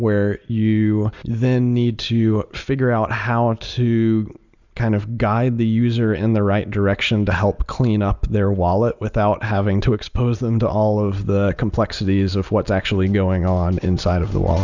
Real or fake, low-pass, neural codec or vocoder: real; 7.2 kHz; none